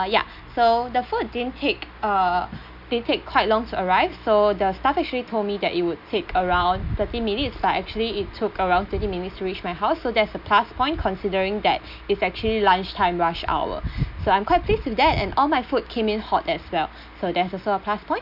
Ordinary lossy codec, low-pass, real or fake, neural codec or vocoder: none; 5.4 kHz; real; none